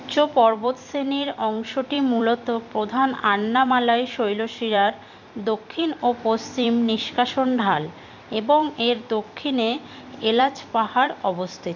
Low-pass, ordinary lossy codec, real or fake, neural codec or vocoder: 7.2 kHz; none; fake; vocoder, 44.1 kHz, 128 mel bands every 256 samples, BigVGAN v2